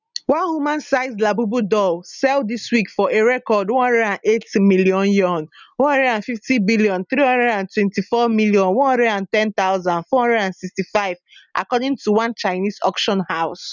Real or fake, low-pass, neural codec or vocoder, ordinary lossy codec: real; 7.2 kHz; none; none